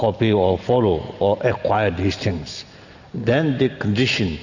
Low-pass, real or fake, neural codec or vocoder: 7.2 kHz; real; none